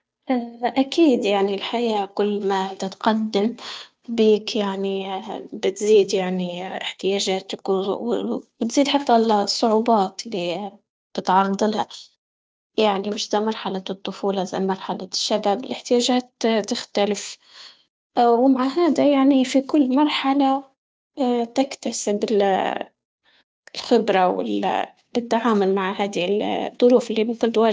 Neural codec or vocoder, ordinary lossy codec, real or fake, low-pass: codec, 16 kHz, 2 kbps, FunCodec, trained on Chinese and English, 25 frames a second; none; fake; none